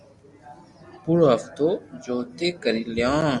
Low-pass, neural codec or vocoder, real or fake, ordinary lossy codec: 10.8 kHz; none; real; Opus, 64 kbps